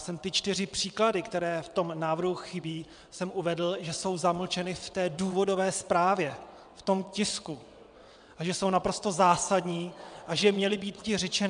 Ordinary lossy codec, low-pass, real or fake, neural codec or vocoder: MP3, 96 kbps; 9.9 kHz; fake; vocoder, 22.05 kHz, 80 mel bands, WaveNeXt